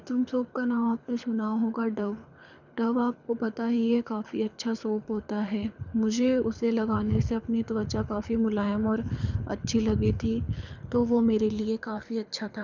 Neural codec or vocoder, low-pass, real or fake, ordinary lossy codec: codec, 24 kHz, 6 kbps, HILCodec; 7.2 kHz; fake; none